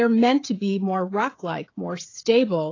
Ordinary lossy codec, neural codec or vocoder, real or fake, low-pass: AAC, 32 kbps; codec, 16 kHz, 16 kbps, FreqCodec, smaller model; fake; 7.2 kHz